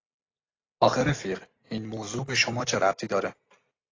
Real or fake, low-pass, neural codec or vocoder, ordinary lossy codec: real; 7.2 kHz; none; AAC, 32 kbps